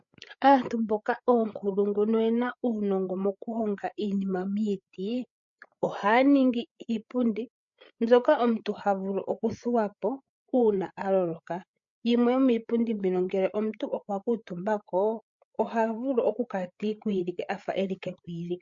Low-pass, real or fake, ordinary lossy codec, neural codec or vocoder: 7.2 kHz; fake; MP3, 48 kbps; codec, 16 kHz, 8 kbps, FreqCodec, larger model